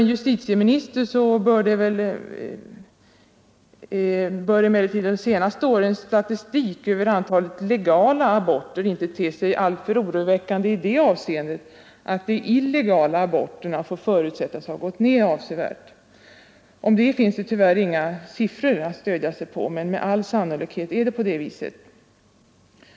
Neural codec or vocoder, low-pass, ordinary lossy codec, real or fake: none; none; none; real